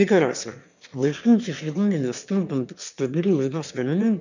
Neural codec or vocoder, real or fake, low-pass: autoencoder, 22.05 kHz, a latent of 192 numbers a frame, VITS, trained on one speaker; fake; 7.2 kHz